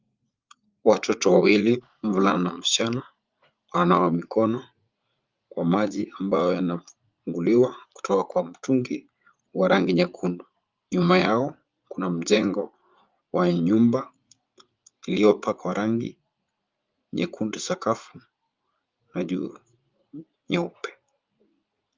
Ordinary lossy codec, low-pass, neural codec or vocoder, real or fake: Opus, 24 kbps; 7.2 kHz; vocoder, 44.1 kHz, 80 mel bands, Vocos; fake